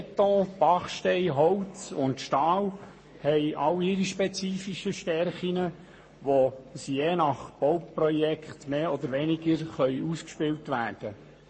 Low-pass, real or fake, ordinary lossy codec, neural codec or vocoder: 9.9 kHz; fake; MP3, 32 kbps; codec, 44.1 kHz, 7.8 kbps, Pupu-Codec